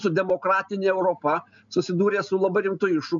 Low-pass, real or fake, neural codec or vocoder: 7.2 kHz; real; none